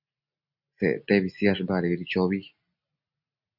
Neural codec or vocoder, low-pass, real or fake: none; 5.4 kHz; real